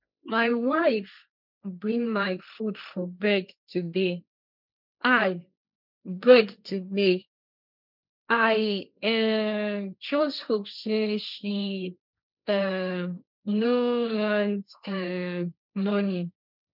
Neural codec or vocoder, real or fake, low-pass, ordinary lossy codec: codec, 16 kHz, 1.1 kbps, Voila-Tokenizer; fake; 5.4 kHz; none